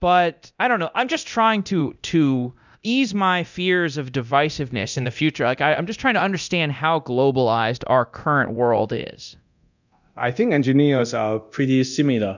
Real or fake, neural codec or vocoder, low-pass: fake; codec, 24 kHz, 0.9 kbps, DualCodec; 7.2 kHz